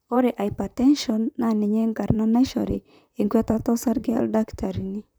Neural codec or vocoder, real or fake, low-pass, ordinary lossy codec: vocoder, 44.1 kHz, 128 mel bands, Pupu-Vocoder; fake; none; none